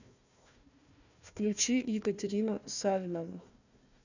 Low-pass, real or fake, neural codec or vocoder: 7.2 kHz; fake; codec, 16 kHz, 1 kbps, FunCodec, trained on Chinese and English, 50 frames a second